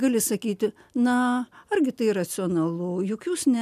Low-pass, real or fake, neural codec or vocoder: 14.4 kHz; real; none